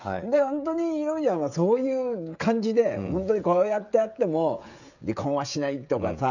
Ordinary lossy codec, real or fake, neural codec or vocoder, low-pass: none; fake; codec, 16 kHz, 16 kbps, FreqCodec, smaller model; 7.2 kHz